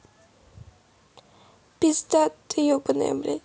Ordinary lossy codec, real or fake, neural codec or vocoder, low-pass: none; real; none; none